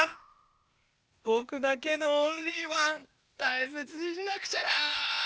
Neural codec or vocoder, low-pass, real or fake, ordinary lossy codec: codec, 16 kHz, 0.8 kbps, ZipCodec; none; fake; none